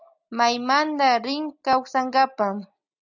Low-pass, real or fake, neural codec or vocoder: 7.2 kHz; real; none